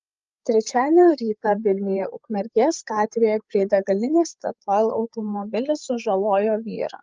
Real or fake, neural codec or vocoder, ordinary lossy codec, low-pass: fake; codec, 16 kHz, 8 kbps, FreqCodec, larger model; Opus, 24 kbps; 7.2 kHz